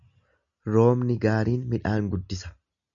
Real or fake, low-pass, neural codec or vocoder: real; 7.2 kHz; none